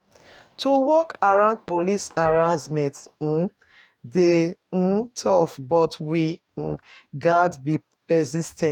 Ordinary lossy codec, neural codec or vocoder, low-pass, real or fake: none; codec, 44.1 kHz, 2.6 kbps, DAC; 19.8 kHz; fake